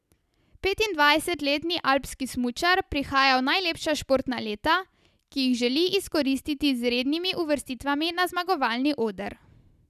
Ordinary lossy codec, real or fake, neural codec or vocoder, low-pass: none; real; none; 14.4 kHz